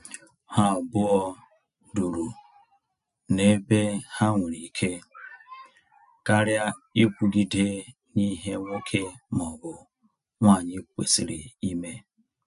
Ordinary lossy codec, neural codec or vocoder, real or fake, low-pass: none; none; real; 10.8 kHz